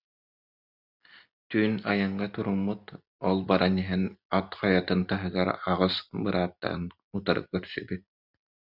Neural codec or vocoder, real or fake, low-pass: none; real; 5.4 kHz